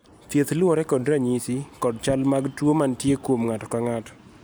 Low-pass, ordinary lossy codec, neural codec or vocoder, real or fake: none; none; none; real